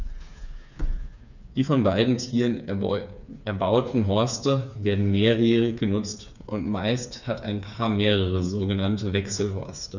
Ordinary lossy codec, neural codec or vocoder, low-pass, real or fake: none; codec, 16 kHz, 4 kbps, FreqCodec, smaller model; 7.2 kHz; fake